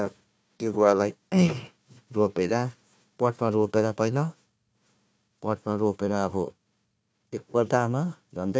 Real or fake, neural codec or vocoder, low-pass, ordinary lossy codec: fake; codec, 16 kHz, 1 kbps, FunCodec, trained on Chinese and English, 50 frames a second; none; none